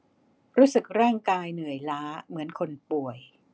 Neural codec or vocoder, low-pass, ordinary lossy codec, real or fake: none; none; none; real